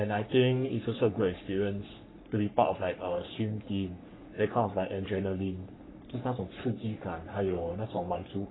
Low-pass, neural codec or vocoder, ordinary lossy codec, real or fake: 7.2 kHz; codec, 44.1 kHz, 3.4 kbps, Pupu-Codec; AAC, 16 kbps; fake